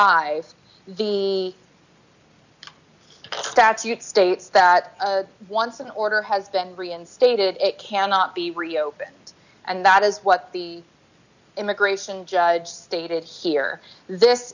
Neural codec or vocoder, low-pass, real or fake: none; 7.2 kHz; real